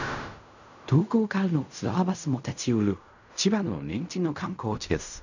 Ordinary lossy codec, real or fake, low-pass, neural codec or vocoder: none; fake; 7.2 kHz; codec, 16 kHz in and 24 kHz out, 0.4 kbps, LongCat-Audio-Codec, fine tuned four codebook decoder